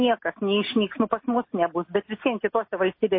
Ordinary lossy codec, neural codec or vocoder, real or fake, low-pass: MP3, 24 kbps; none; real; 5.4 kHz